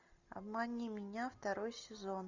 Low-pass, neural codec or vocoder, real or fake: 7.2 kHz; none; real